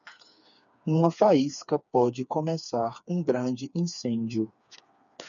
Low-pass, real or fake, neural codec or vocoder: 7.2 kHz; fake; codec, 16 kHz, 4 kbps, FreqCodec, smaller model